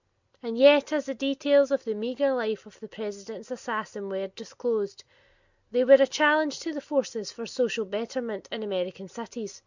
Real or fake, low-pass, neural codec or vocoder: real; 7.2 kHz; none